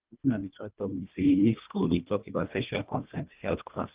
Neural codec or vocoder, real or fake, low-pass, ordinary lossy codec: codec, 24 kHz, 1.5 kbps, HILCodec; fake; 3.6 kHz; Opus, 32 kbps